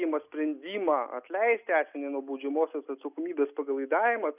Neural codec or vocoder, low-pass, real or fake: none; 3.6 kHz; real